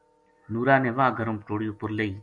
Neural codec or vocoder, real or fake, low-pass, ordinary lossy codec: none; real; 9.9 kHz; AAC, 64 kbps